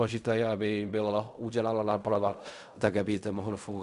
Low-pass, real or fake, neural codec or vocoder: 10.8 kHz; fake; codec, 16 kHz in and 24 kHz out, 0.4 kbps, LongCat-Audio-Codec, fine tuned four codebook decoder